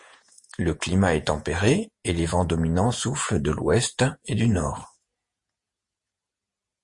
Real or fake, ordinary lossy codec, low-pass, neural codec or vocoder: fake; MP3, 48 kbps; 10.8 kHz; vocoder, 48 kHz, 128 mel bands, Vocos